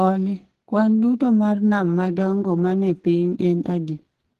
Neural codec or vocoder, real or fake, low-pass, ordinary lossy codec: codec, 44.1 kHz, 2.6 kbps, SNAC; fake; 14.4 kHz; Opus, 16 kbps